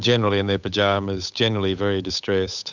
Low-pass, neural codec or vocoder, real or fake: 7.2 kHz; codec, 16 kHz, 8 kbps, FunCodec, trained on Chinese and English, 25 frames a second; fake